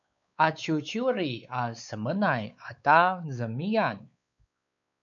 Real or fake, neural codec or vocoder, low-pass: fake; codec, 16 kHz, 4 kbps, X-Codec, WavLM features, trained on Multilingual LibriSpeech; 7.2 kHz